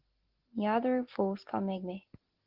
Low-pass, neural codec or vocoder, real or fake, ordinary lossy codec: 5.4 kHz; none; real; Opus, 16 kbps